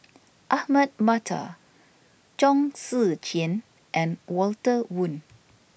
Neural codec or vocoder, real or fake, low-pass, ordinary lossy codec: none; real; none; none